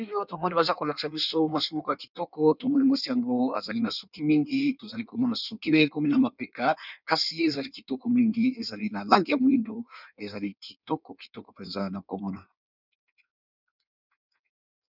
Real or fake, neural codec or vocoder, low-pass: fake; codec, 16 kHz in and 24 kHz out, 1.1 kbps, FireRedTTS-2 codec; 5.4 kHz